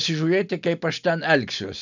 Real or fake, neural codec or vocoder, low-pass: real; none; 7.2 kHz